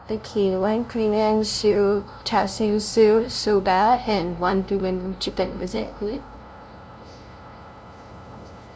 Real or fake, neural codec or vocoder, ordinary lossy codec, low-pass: fake; codec, 16 kHz, 0.5 kbps, FunCodec, trained on LibriTTS, 25 frames a second; none; none